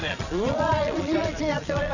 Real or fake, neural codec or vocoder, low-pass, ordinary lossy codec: fake; vocoder, 22.05 kHz, 80 mel bands, Vocos; 7.2 kHz; none